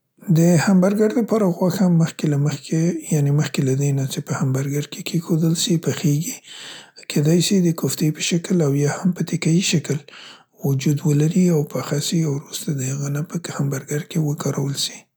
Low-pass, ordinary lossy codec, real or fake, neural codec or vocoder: none; none; real; none